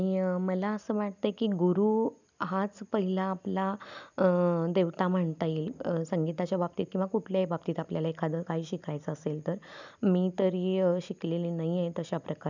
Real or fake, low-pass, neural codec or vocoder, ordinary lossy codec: real; 7.2 kHz; none; none